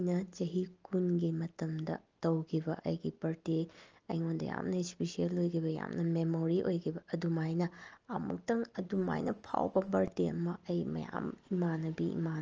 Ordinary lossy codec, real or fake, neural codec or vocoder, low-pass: Opus, 24 kbps; real; none; 7.2 kHz